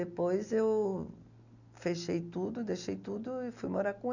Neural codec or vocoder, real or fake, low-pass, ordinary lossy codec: none; real; 7.2 kHz; none